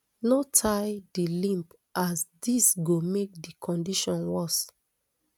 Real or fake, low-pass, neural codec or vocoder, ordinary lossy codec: real; 19.8 kHz; none; none